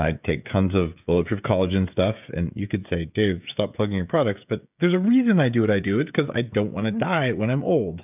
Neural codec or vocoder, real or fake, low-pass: codec, 16 kHz, 16 kbps, FreqCodec, smaller model; fake; 3.6 kHz